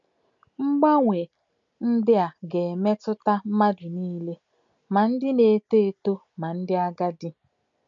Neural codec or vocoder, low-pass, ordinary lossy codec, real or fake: none; 7.2 kHz; MP3, 64 kbps; real